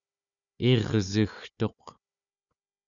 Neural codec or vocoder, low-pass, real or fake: codec, 16 kHz, 4 kbps, FunCodec, trained on Chinese and English, 50 frames a second; 7.2 kHz; fake